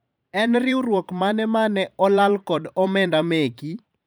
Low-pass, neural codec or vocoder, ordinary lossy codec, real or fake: none; vocoder, 44.1 kHz, 128 mel bands every 512 samples, BigVGAN v2; none; fake